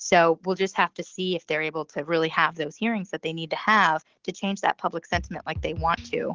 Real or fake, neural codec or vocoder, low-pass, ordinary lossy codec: real; none; 7.2 kHz; Opus, 16 kbps